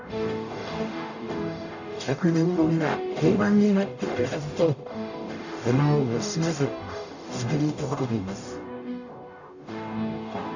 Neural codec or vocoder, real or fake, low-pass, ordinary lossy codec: codec, 44.1 kHz, 0.9 kbps, DAC; fake; 7.2 kHz; none